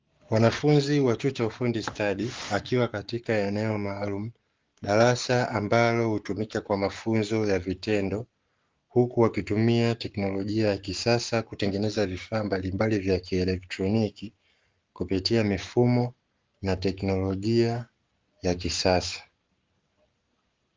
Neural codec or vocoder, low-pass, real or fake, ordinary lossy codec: codec, 44.1 kHz, 7.8 kbps, Pupu-Codec; 7.2 kHz; fake; Opus, 32 kbps